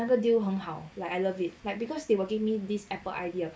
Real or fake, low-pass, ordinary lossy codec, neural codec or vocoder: real; none; none; none